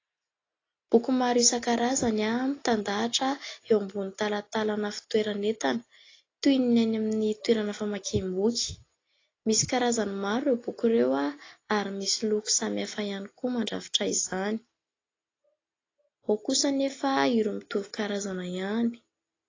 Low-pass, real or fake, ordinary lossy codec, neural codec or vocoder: 7.2 kHz; real; AAC, 32 kbps; none